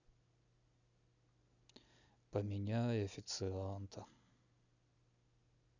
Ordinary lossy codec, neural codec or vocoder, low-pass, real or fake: none; none; 7.2 kHz; real